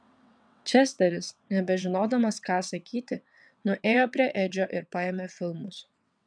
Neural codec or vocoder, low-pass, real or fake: vocoder, 22.05 kHz, 80 mel bands, WaveNeXt; 9.9 kHz; fake